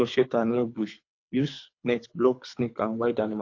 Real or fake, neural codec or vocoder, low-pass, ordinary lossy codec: fake; codec, 24 kHz, 3 kbps, HILCodec; 7.2 kHz; AAC, 48 kbps